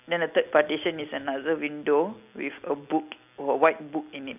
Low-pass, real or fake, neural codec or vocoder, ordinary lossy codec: 3.6 kHz; real; none; none